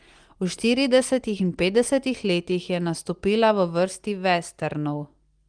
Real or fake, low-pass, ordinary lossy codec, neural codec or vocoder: real; 9.9 kHz; Opus, 24 kbps; none